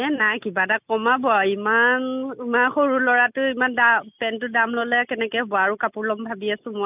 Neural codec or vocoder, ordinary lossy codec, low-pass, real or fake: none; none; 3.6 kHz; real